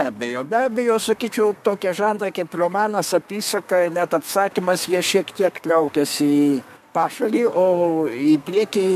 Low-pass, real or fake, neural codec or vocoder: 14.4 kHz; fake; codec, 32 kHz, 1.9 kbps, SNAC